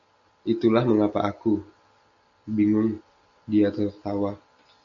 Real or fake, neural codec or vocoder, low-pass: real; none; 7.2 kHz